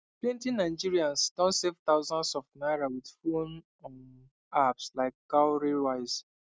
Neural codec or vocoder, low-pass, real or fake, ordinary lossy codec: none; none; real; none